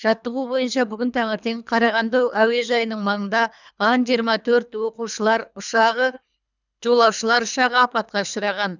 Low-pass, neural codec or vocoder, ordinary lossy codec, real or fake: 7.2 kHz; codec, 24 kHz, 3 kbps, HILCodec; none; fake